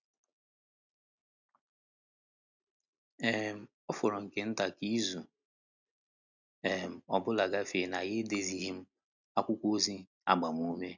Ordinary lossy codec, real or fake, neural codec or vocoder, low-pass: none; real; none; 7.2 kHz